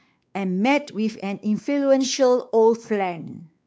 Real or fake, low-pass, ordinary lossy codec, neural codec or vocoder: fake; none; none; codec, 16 kHz, 4 kbps, X-Codec, WavLM features, trained on Multilingual LibriSpeech